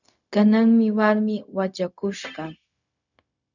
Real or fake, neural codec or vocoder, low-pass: fake; codec, 16 kHz, 0.4 kbps, LongCat-Audio-Codec; 7.2 kHz